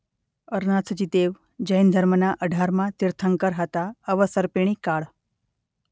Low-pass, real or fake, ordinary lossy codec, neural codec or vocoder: none; real; none; none